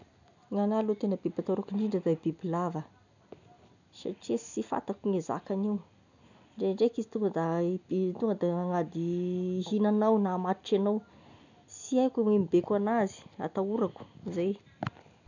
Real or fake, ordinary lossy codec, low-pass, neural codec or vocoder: real; none; 7.2 kHz; none